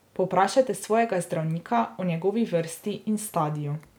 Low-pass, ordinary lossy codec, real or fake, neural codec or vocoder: none; none; real; none